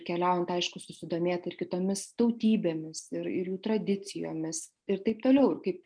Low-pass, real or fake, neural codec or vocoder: 9.9 kHz; real; none